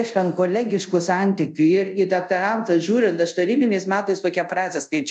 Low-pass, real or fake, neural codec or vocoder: 10.8 kHz; fake; codec, 24 kHz, 0.5 kbps, DualCodec